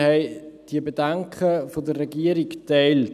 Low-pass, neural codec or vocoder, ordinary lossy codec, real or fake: 14.4 kHz; none; none; real